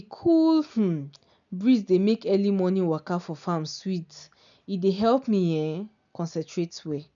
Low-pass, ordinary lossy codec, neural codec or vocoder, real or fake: 7.2 kHz; none; none; real